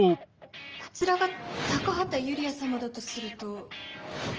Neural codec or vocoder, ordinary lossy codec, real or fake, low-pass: none; Opus, 24 kbps; real; 7.2 kHz